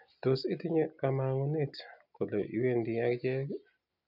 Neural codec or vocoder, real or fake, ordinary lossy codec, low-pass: none; real; none; 5.4 kHz